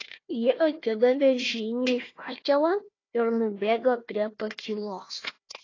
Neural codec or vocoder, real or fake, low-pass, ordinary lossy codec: codec, 16 kHz, 1 kbps, FunCodec, trained on Chinese and English, 50 frames a second; fake; 7.2 kHz; AAC, 32 kbps